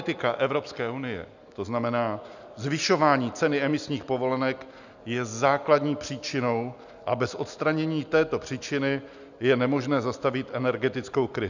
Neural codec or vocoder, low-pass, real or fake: none; 7.2 kHz; real